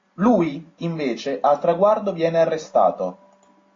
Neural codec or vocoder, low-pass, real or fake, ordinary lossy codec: none; 7.2 kHz; real; AAC, 32 kbps